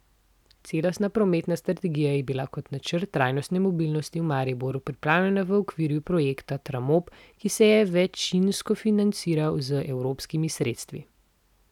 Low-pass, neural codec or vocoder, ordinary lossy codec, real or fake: 19.8 kHz; none; none; real